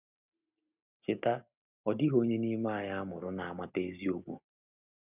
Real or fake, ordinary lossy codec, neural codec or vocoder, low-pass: real; none; none; 3.6 kHz